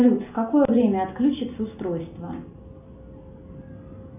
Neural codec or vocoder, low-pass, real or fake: none; 3.6 kHz; real